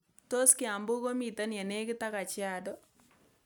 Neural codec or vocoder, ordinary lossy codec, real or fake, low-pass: none; none; real; none